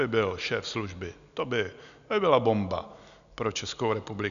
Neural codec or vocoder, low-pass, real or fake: none; 7.2 kHz; real